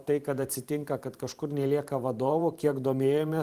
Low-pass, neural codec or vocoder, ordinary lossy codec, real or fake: 14.4 kHz; vocoder, 44.1 kHz, 128 mel bands every 512 samples, BigVGAN v2; Opus, 24 kbps; fake